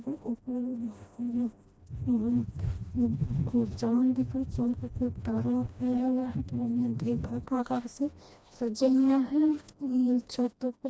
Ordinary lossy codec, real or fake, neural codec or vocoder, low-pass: none; fake; codec, 16 kHz, 1 kbps, FreqCodec, smaller model; none